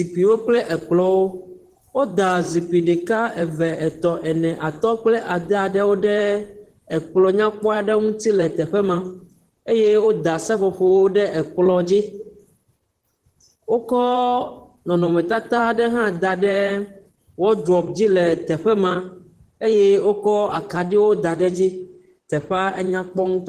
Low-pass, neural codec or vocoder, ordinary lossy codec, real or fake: 14.4 kHz; vocoder, 44.1 kHz, 128 mel bands, Pupu-Vocoder; Opus, 16 kbps; fake